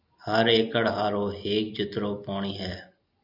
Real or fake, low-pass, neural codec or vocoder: real; 5.4 kHz; none